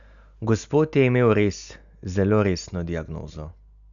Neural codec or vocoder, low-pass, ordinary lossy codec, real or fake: none; 7.2 kHz; none; real